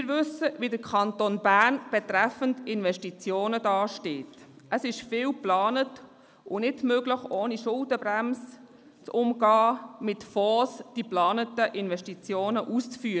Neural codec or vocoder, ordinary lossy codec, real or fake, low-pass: none; none; real; none